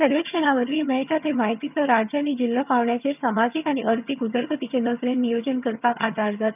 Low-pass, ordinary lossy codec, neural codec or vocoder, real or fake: 3.6 kHz; none; vocoder, 22.05 kHz, 80 mel bands, HiFi-GAN; fake